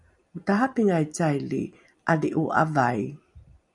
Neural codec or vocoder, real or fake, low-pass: vocoder, 44.1 kHz, 128 mel bands every 256 samples, BigVGAN v2; fake; 10.8 kHz